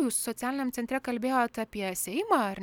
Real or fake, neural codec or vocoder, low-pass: real; none; 19.8 kHz